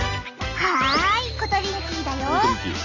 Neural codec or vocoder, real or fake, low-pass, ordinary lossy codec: none; real; 7.2 kHz; none